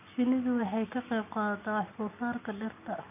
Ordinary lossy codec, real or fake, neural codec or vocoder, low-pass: none; real; none; 3.6 kHz